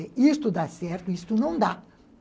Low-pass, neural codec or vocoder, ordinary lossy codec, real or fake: none; none; none; real